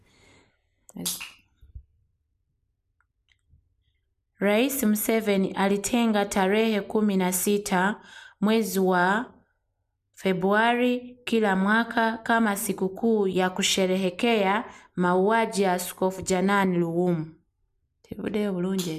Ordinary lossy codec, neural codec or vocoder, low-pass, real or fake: none; none; 14.4 kHz; real